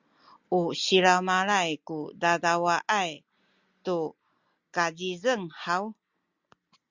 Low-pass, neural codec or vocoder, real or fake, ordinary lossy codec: 7.2 kHz; none; real; Opus, 64 kbps